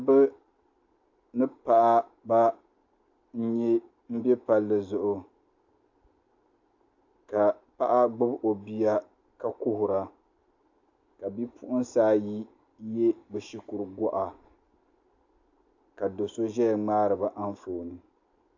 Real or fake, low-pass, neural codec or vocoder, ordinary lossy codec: real; 7.2 kHz; none; AAC, 48 kbps